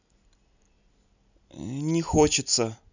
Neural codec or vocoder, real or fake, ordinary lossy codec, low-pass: none; real; none; 7.2 kHz